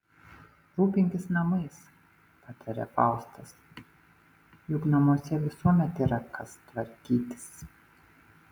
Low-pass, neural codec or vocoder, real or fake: 19.8 kHz; none; real